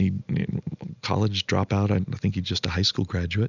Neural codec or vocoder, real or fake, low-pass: none; real; 7.2 kHz